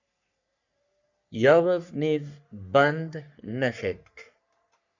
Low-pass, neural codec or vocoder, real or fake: 7.2 kHz; codec, 44.1 kHz, 3.4 kbps, Pupu-Codec; fake